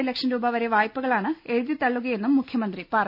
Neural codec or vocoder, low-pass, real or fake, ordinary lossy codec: none; 5.4 kHz; real; none